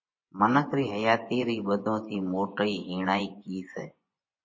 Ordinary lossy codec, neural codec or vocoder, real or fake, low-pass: MP3, 32 kbps; vocoder, 24 kHz, 100 mel bands, Vocos; fake; 7.2 kHz